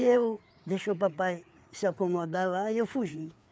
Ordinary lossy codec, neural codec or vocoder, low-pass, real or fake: none; codec, 16 kHz, 4 kbps, FreqCodec, larger model; none; fake